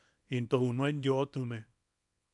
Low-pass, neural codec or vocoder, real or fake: 10.8 kHz; codec, 24 kHz, 0.9 kbps, WavTokenizer, small release; fake